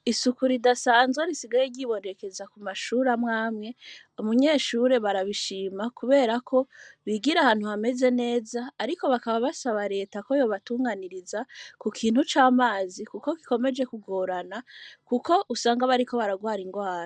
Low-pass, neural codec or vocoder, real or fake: 9.9 kHz; none; real